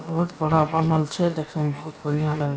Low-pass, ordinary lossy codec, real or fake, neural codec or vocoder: none; none; fake; codec, 16 kHz, about 1 kbps, DyCAST, with the encoder's durations